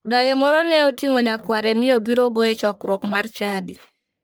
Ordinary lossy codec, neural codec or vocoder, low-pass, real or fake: none; codec, 44.1 kHz, 1.7 kbps, Pupu-Codec; none; fake